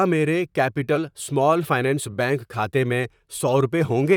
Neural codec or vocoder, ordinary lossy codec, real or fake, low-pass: vocoder, 44.1 kHz, 128 mel bands, Pupu-Vocoder; none; fake; 19.8 kHz